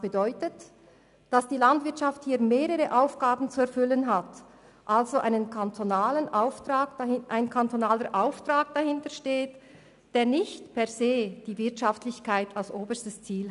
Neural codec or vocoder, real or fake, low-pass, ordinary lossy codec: none; real; 10.8 kHz; none